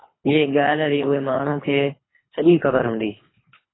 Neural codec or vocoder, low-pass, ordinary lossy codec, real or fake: codec, 24 kHz, 3 kbps, HILCodec; 7.2 kHz; AAC, 16 kbps; fake